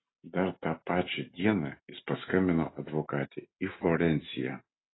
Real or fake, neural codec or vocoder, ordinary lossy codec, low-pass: real; none; AAC, 16 kbps; 7.2 kHz